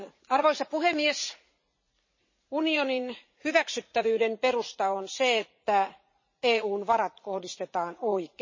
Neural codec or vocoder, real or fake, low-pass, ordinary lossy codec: vocoder, 44.1 kHz, 80 mel bands, Vocos; fake; 7.2 kHz; MP3, 32 kbps